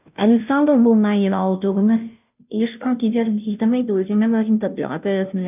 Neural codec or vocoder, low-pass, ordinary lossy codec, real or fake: codec, 16 kHz, 0.5 kbps, FunCodec, trained on Chinese and English, 25 frames a second; 3.6 kHz; none; fake